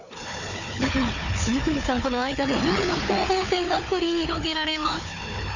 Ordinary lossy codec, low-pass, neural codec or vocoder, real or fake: none; 7.2 kHz; codec, 16 kHz, 4 kbps, FunCodec, trained on Chinese and English, 50 frames a second; fake